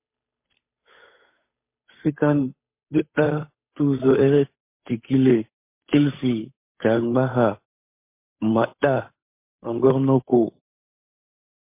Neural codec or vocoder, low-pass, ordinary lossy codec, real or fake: codec, 16 kHz, 8 kbps, FunCodec, trained on Chinese and English, 25 frames a second; 3.6 kHz; MP3, 24 kbps; fake